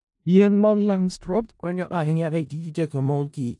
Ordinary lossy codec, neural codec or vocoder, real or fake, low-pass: AAC, 64 kbps; codec, 16 kHz in and 24 kHz out, 0.4 kbps, LongCat-Audio-Codec, four codebook decoder; fake; 10.8 kHz